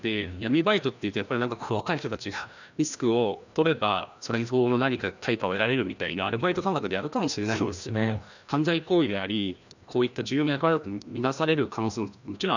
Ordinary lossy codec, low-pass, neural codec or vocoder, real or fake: none; 7.2 kHz; codec, 16 kHz, 1 kbps, FreqCodec, larger model; fake